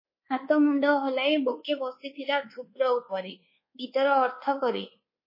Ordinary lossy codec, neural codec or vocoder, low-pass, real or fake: MP3, 24 kbps; codec, 24 kHz, 1.2 kbps, DualCodec; 5.4 kHz; fake